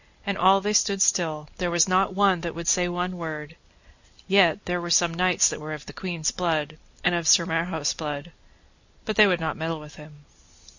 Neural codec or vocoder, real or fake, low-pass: none; real; 7.2 kHz